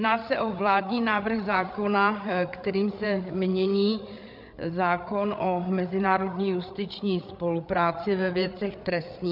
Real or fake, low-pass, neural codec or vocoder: fake; 5.4 kHz; codec, 16 kHz, 8 kbps, FreqCodec, larger model